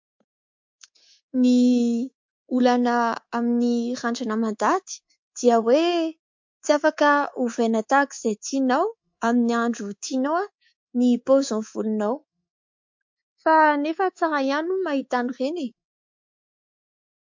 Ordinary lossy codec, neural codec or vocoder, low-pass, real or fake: MP3, 48 kbps; codec, 24 kHz, 3.1 kbps, DualCodec; 7.2 kHz; fake